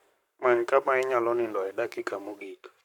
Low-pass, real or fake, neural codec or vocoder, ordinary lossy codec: 19.8 kHz; fake; codec, 44.1 kHz, 7.8 kbps, Pupu-Codec; none